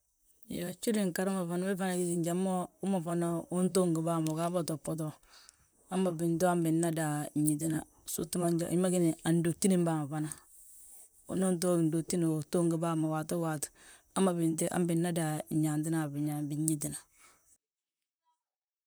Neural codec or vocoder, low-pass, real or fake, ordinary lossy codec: vocoder, 44.1 kHz, 128 mel bands, Pupu-Vocoder; none; fake; none